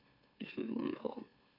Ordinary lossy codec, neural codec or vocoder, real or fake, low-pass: AAC, 32 kbps; autoencoder, 44.1 kHz, a latent of 192 numbers a frame, MeloTTS; fake; 5.4 kHz